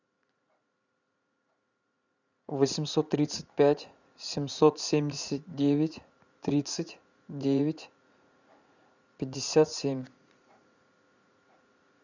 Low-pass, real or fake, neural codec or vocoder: 7.2 kHz; fake; vocoder, 44.1 kHz, 80 mel bands, Vocos